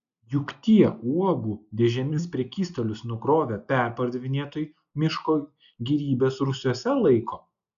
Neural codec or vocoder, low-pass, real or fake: none; 7.2 kHz; real